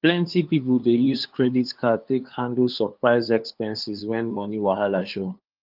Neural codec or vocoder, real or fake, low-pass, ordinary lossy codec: codec, 16 kHz, 2 kbps, FunCodec, trained on LibriTTS, 25 frames a second; fake; 5.4 kHz; Opus, 24 kbps